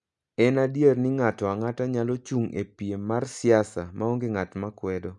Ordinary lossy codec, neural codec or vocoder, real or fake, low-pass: none; none; real; 10.8 kHz